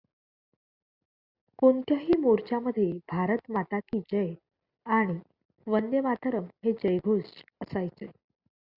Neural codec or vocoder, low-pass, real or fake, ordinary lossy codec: none; 5.4 kHz; real; Opus, 64 kbps